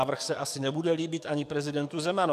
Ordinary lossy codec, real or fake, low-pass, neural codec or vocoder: AAC, 96 kbps; fake; 14.4 kHz; codec, 44.1 kHz, 7.8 kbps, DAC